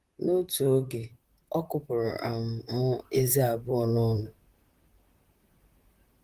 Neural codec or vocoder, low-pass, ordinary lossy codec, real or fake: vocoder, 44.1 kHz, 128 mel bands, Pupu-Vocoder; 14.4 kHz; Opus, 24 kbps; fake